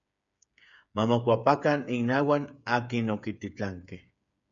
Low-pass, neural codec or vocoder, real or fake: 7.2 kHz; codec, 16 kHz, 8 kbps, FreqCodec, smaller model; fake